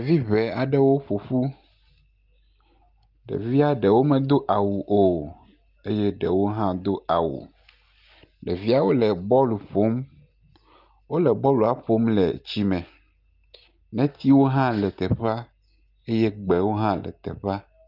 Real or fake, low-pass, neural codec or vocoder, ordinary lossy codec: real; 5.4 kHz; none; Opus, 24 kbps